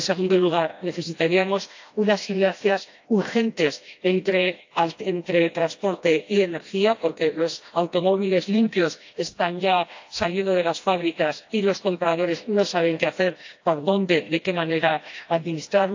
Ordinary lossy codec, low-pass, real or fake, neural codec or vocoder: AAC, 48 kbps; 7.2 kHz; fake; codec, 16 kHz, 1 kbps, FreqCodec, smaller model